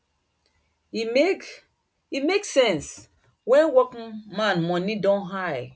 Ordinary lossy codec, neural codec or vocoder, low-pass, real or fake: none; none; none; real